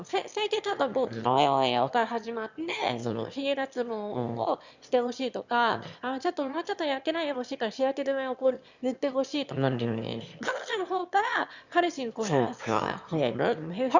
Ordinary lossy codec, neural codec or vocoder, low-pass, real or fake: Opus, 64 kbps; autoencoder, 22.05 kHz, a latent of 192 numbers a frame, VITS, trained on one speaker; 7.2 kHz; fake